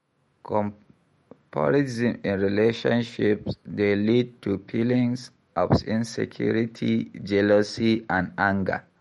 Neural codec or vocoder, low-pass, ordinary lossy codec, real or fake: autoencoder, 48 kHz, 128 numbers a frame, DAC-VAE, trained on Japanese speech; 19.8 kHz; MP3, 48 kbps; fake